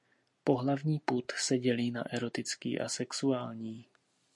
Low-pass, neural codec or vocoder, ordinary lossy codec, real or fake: 10.8 kHz; none; MP3, 48 kbps; real